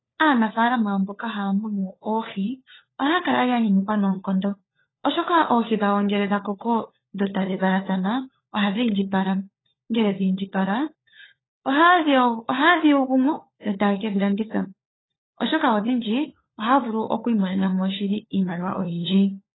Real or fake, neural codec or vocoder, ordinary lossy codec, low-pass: fake; codec, 16 kHz, 4 kbps, FunCodec, trained on LibriTTS, 50 frames a second; AAC, 16 kbps; 7.2 kHz